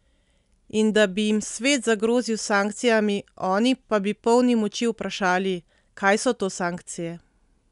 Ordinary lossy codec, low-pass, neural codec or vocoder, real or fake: none; 10.8 kHz; none; real